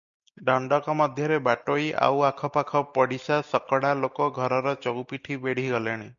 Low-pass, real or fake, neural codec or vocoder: 7.2 kHz; real; none